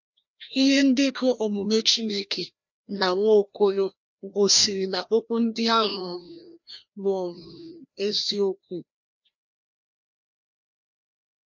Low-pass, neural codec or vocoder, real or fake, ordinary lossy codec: 7.2 kHz; codec, 16 kHz, 1 kbps, FreqCodec, larger model; fake; MP3, 64 kbps